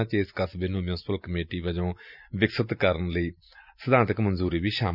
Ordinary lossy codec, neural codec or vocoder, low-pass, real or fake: none; none; 5.4 kHz; real